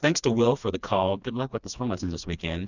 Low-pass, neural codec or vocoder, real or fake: 7.2 kHz; codec, 16 kHz, 2 kbps, FreqCodec, smaller model; fake